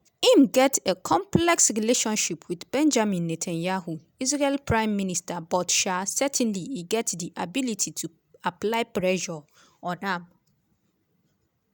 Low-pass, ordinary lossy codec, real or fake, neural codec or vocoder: none; none; real; none